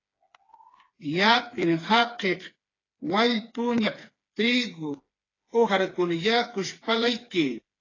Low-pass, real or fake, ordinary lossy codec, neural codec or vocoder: 7.2 kHz; fake; AAC, 32 kbps; codec, 16 kHz, 4 kbps, FreqCodec, smaller model